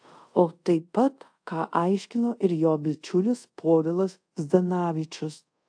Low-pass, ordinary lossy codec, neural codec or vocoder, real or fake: 9.9 kHz; AAC, 64 kbps; codec, 24 kHz, 0.5 kbps, DualCodec; fake